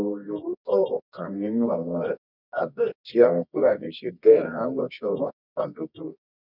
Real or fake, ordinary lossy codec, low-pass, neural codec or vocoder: fake; none; 5.4 kHz; codec, 24 kHz, 0.9 kbps, WavTokenizer, medium music audio release